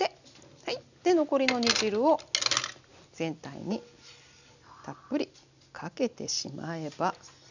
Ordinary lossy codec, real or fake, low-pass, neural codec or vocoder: none; real; 7.2 kHz; none